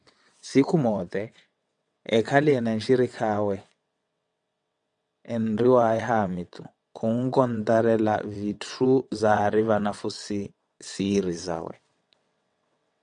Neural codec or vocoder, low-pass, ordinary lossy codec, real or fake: vocoder, 22.05 kHz, 80 mel bands, WaveNeXt; 9.9 kHz; MP3, 96 kbps; fake